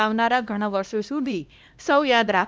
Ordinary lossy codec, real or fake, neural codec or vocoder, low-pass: none; fake; codec, 16 kHz, 1 kbps, X-Codec, HuBERT features, trained on LibriSpeech; none